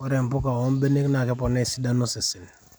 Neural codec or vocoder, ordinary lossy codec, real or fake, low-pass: vocoder, 44.1 kHz, 128 mel bands every 256 samples, BigVGAN v2; none; fake; none